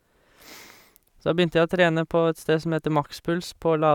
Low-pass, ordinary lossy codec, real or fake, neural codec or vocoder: 19.8 kHz; none; fake; vocoder, 44.1 kHz, 128 mel bands every 512 samples, BigVGAN v2